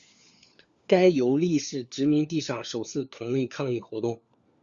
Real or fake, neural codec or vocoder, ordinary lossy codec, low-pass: fake; codec, 16 kHz, 4 kbps, FunCodec, trained on LibriTTS, 50 frames a second; Opus, 64 kbps; 7.2 kHz